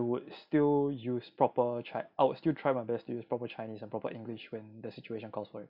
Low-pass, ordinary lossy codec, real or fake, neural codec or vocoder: 5.4 kHz; none; real; none